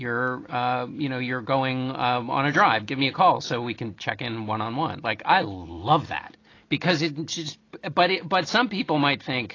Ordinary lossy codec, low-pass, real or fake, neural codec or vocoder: AAC, 32 kbps; 7.2 kHz; real; none